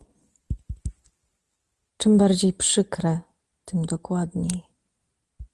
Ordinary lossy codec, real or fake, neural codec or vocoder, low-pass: Opus, 24 kbps; real; none; 10.8 kHz